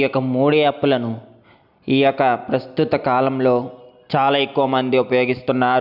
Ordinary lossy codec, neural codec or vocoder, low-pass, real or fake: none; none; 5.4 kHz; real